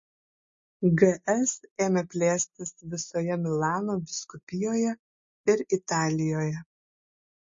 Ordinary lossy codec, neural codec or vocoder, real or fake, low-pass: MP3, 32 kbps; none; real; 7.2 kHz